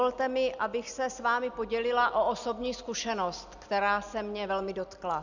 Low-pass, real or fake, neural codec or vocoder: 7.2 kHz; real; none